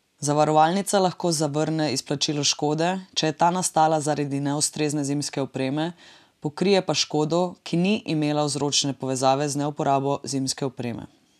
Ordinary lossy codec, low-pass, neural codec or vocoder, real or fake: none; 14.4 kHz; none; real